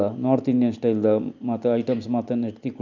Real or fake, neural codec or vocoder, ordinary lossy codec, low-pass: real; none; none; 7.2 kHz